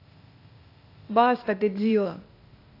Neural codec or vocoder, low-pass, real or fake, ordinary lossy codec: codec, 16 kHz, 0.8 kbps, ZipCodec; 5.4 kHz; fake; AAC, 32 kbps